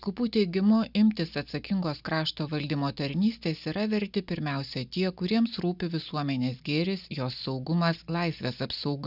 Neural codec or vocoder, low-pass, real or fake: none; 5.4 kHz; real